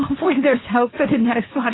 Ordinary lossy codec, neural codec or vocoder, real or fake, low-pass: AAC, 16 kbps; codec, 24 kHz, 0.9 kbps, WavTokenizer, medium speech release version 2; fake; 7.2 kHz